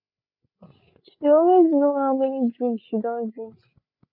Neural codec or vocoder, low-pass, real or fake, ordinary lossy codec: codec, 16 kHz, 8 kbps, FreqCodec, larger model; 5.4 kHz; fake; none